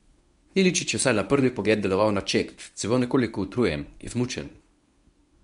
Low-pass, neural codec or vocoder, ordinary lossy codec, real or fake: 10.8 kHz; codec, 24 kHz, 0.9 kbps, WavTokenizer, medium speech release version 1; none; fake